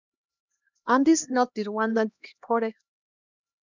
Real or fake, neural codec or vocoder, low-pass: fake; codec, 16 kHz, 1 kbps, X-Codec, HuBERT features, trained on LibriSpeech; 7.2 kHz